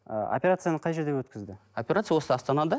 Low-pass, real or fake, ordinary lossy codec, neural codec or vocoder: none; real; none; none